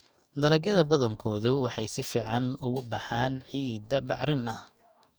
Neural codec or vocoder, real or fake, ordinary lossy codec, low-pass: codec, 44.1 kHz, 2.6 kbps, DAC; fake; none; none